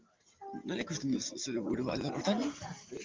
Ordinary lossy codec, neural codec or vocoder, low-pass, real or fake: Opus, 32 kbps; none; 7.2 kHz; real